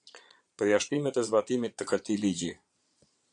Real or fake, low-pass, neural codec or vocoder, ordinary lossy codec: fake; 9.9 kHz; vocoder, 22.05 kHz, 80 mel bands, Vocos; AAC, 64 kbps